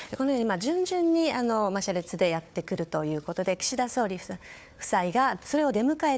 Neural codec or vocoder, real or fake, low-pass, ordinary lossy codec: codec, 16 kHz, 4 kbps, FunCodec, trained on Chinese and English, 50 frames a second; fake; none; none